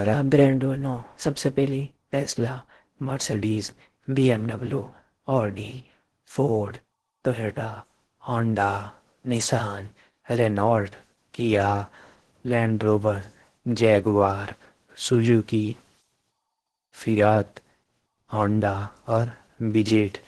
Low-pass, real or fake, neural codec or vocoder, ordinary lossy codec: 10.8 kHz; fake; codec, 16 kHz in and 24 kHz out, 0.6 kbps, FocalCodec, streaming, 4096 codes; Opus, 16 kbps